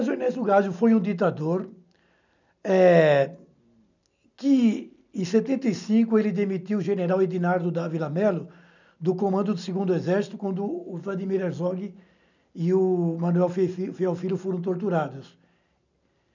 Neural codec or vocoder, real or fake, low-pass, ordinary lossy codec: none; real; 7.2 kHz; none